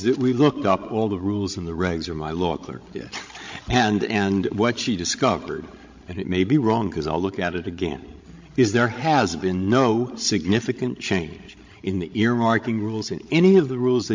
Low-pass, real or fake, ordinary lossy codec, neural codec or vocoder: 7.2 kHz; fake; MP3, 48 kbps; codec, 16 kHz, 16 kbps, FreqCodec, larger model